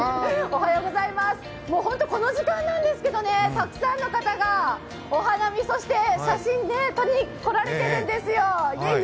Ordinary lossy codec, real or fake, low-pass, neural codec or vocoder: none; real; none; none